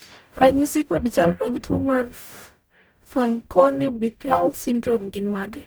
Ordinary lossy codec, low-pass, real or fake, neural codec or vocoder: none; none; fake; codec, 44.1 kHz, 0.9 kbps, DAC